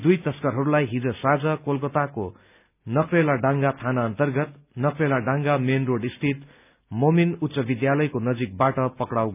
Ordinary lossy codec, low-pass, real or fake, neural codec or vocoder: none; 3.6 kHz; real; none